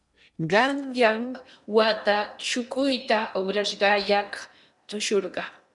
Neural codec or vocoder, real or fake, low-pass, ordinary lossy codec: codec, 16 kHz in and 24 kHz out, 0.8 kbps, FocalCodec, streaming, 65536 codes; fake; 10.8 kHz; MP3, 96 kbps